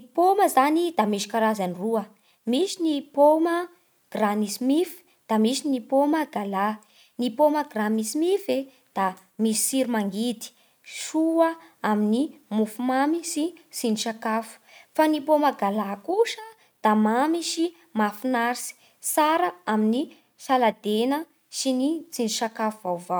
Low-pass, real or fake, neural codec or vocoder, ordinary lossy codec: none; real; none; none